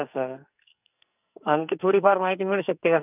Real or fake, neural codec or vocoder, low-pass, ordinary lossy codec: fake; codec, 44.1 kHz, 2.6 kbps, SNAC; 3.6 kHz; none